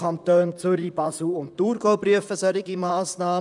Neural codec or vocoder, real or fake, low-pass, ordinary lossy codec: vocoder, 44.1 kHz, 128 mel bands, Pupu-Vocoder; fake; 10.8 kHz; none